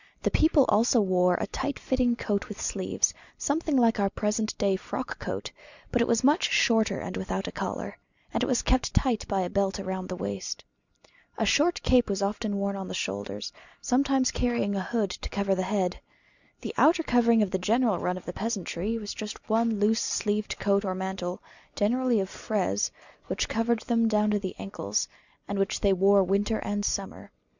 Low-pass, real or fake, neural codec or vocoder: 7.2 kHz; real; none